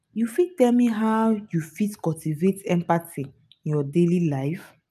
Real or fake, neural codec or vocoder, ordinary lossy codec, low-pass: real; none; none; 14.4 kHz